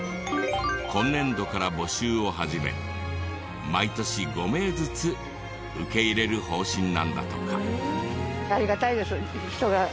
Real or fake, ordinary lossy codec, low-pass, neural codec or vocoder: real; none; none; none